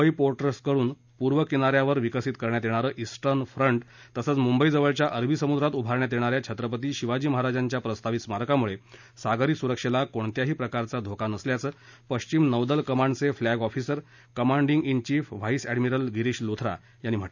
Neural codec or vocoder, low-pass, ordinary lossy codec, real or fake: none; 7.2 kHz; none; real